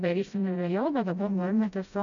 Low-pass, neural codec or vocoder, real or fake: 7.2 kHz; codec, 16 kHz, 0.5 kbps, FreqCodec, smaller model; fake